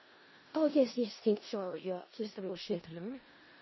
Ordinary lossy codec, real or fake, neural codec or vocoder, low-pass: MP3, 24 kbps; fake; codec, 16 kHz in and 24 kHz out, 0.4 kbps, LongCat-Audio-Codec, four codebook decoder; 7.2 kHz